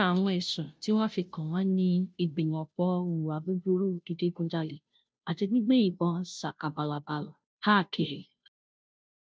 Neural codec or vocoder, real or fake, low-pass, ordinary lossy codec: codec, 16 kHz, 0.5 kbps, FunCodec, trained on Chinese and English, 25 frames a second; fake; none; none